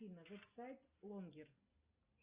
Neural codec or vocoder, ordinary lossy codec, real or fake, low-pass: none; Opus, 64 kbps; real; 3.6 kHz